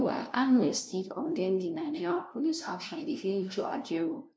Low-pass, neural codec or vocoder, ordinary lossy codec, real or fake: none; codec, 16 kHz, 0.5 kbps, FunCodec, trained on LibriTTS, 25 frames a second; none; fake